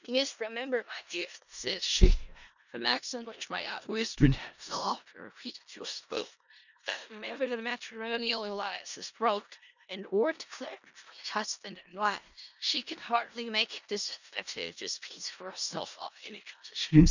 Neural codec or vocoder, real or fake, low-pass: codec, 16 kHz in and 24 kHz out, 0.4 kbps, LongCat-Audio-Codec, four codebook decoder; fake; 7.2 kHz